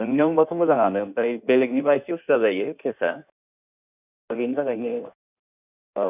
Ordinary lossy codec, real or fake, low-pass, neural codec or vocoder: none; fake; 3.6 kHz; codec, 16 kHz in and 24 kHz out, 1.1 kbps, FireRedTTS-2 codec